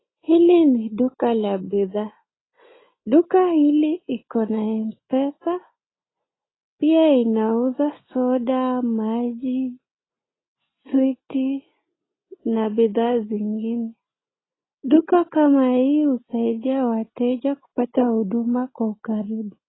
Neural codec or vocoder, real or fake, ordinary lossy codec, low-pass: none; real; AAC, 16 kbps; 7.2 kHz